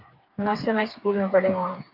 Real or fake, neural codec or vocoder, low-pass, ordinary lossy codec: fake; codec, 16 kHz, 4 kbps, FreqCodec, smaller model; 5.4 kHz; AAC, 48 kbps